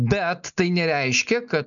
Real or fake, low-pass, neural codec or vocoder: real; 7.2 kHz; none